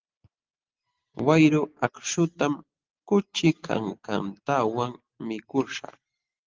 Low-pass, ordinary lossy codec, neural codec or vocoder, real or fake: 7.2 kHz; Opus, 16 kbps; none; real